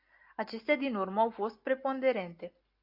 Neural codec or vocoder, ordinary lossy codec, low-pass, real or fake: none; AAC, 48 kbps; 5.4 kHz; real